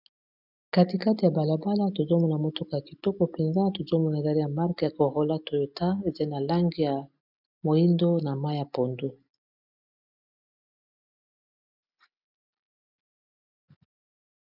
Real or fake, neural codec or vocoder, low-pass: real; none; 5.4 kHz